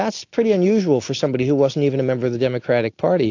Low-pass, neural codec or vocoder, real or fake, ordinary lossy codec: 7.2 kHz; none; real; AAC, 48 kbps